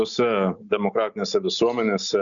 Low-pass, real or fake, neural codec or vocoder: 7.2 kHz; real; none